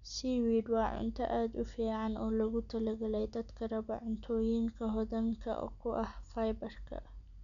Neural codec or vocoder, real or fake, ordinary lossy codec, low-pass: none; real; none; 7.2 kHz